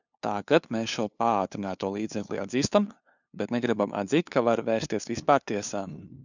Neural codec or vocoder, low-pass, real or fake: codec, 16 kHz, 2 kbps, FunCodec, trained on LibriTTS, 25 frames a second; 7.2 kHz; fake